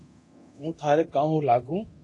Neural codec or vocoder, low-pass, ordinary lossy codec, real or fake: codec, 24 kHz, 0.9 kbps, DualCodec; 10.8 kHz; AAC, 48 kbps; fake